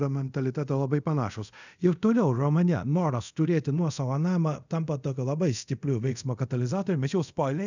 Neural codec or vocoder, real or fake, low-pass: codec, 24 kHz, 0.5 kbps, DualCodec; fake; 7.2 kHz